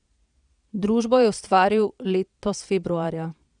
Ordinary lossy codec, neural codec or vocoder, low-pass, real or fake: none; vocoder, 22.05 kHz, 80 mel bands, Vocos; 9.9 kHz; fake